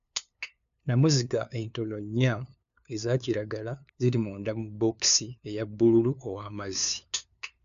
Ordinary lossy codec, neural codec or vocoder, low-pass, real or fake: AAC, 96 kbps; codec, 16 kHz, 2 kbps, FunCodec, trained on LibriTTS, 25 frames a second; 7.2 kHz; fake